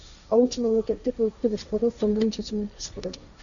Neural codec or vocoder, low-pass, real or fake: codec, 16 kHz, 1.1 kbps, Voila-Tokenizer; 7.2 kHz; fake